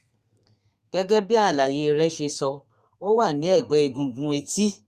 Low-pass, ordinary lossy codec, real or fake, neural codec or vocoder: 14.4 kHz; Opus, 64 kbps; fake; codec, 32 kHz, 1.9 kbps, SNAC